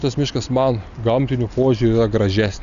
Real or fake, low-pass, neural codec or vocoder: real; 7.2 kHz; none